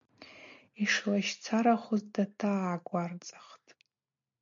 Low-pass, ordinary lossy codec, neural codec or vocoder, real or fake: 7.2 kHz; MP3, 64 kbps; none; real